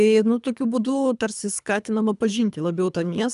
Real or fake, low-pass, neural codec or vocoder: fake; 10.8 kHz; codec, 24 kHz, 3 kbps, HILCodec